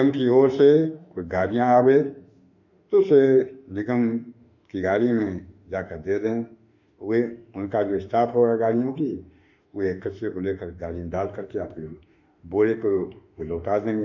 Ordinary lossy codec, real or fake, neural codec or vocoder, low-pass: none; fake; autoencoder, 48 kHz, 32 numbers a frame, DAC-VAE, trained on Japanese speech; 7.2 kHz